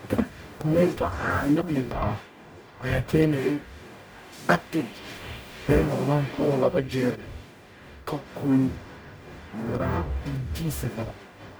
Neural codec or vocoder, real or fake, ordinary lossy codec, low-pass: codec, 44.1 kHz, 0.9 kbps, DAC; fake; none; none